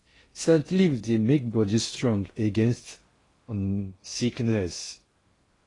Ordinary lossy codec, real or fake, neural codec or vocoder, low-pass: AAC, 32 kbps; fake; codec, 16 kHz in and 24 kHz out, 0.6 kbps, FocalCodec, streaming, 4096 codes; 10.8 kHz